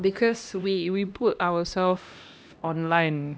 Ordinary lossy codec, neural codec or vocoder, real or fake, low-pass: none; codec, 16 kHz, 1 kbps, X-Codec, HuBERT features, trained on LibriSpeech; fake; none